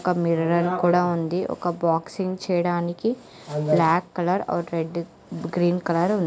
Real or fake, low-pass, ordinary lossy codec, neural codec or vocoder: real; none; none; none